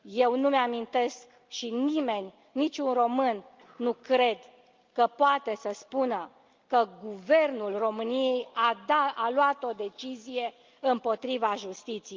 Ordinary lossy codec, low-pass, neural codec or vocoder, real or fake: Opus, 32 kbps; 7.2 kHz; none; real